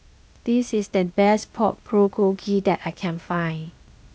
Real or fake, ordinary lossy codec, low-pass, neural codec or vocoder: fake; none; none; codec, 16 kHz, 0.8 kbps, ZipCodec